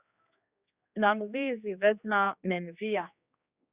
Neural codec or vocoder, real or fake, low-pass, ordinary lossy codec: codec, 16 kHz, 2 kbps, X-Codec, HuBERT features, trained on general audio; fake; 3.6 kHz; Opus, 64 kbps